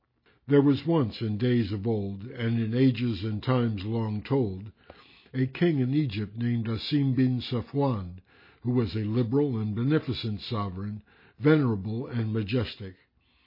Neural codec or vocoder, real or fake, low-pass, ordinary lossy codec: none; real; 5.4 kHz; MP3, 24 kbps